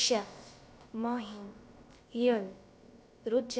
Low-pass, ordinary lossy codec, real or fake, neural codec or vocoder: none; none; fake; codec, 16 kHz, about 1 kbps, DyCAST, with the encoder's durations